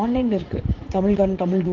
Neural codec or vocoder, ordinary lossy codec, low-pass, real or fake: vocoder, 44.1 kHz, 80 mel bands, Vocos; Opus, 16 kbps; 7.2 kHz; fake